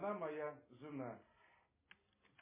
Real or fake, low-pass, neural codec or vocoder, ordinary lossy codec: real; 3.6 kHz; none; MP3, 16 kbps